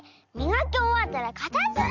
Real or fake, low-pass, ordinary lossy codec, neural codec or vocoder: real; 7.2 kHz; none; none